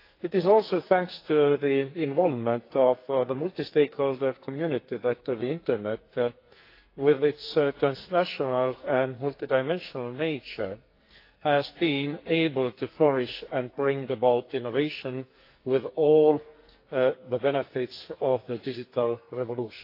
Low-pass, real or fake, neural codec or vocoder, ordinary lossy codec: 5.4 kHz; fake; codec, 44.1 kHz, 2.6 kbps, SNAC; AAC, 32 kbps